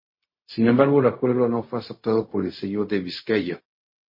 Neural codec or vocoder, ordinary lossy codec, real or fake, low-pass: codec, 16 kHz, 0.4 kbps, LongCat-Audio-Codec; MP3, 24 kbps; fake; 5.4 kHz